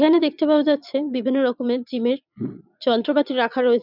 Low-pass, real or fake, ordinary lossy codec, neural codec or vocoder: 5.4 kHz; fake; none; vocoder, 22.05 kHz, 80 mel bands, Vocos